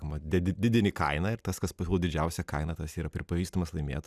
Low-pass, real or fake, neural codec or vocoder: 14.4 kHz; real; none